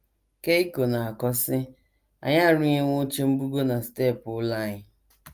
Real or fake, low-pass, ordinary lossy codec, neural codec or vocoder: real; 14.4 kHz; Opus, 32 kbps; none